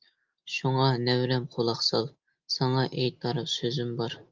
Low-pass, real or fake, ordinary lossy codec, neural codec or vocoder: 7.2 kHz; real; Opus, 32 kbps; none